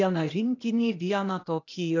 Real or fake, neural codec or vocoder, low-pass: fake; codec, 16 kHz, 0.8 kbps, ZipCodec; 7.2 kHz